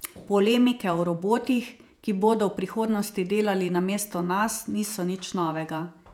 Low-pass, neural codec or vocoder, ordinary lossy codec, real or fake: 19.8 kHz; vocoder, 48 kHz, 128 mel bands, Vocos; none; fake